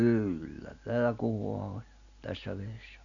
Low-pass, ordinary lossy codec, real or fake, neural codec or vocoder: 7.2 kHz; none; real; none